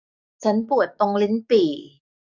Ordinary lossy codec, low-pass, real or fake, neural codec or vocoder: none; 7.2 kHz; fake; codec, 44.1 kHz, 7.8 kbps, DAC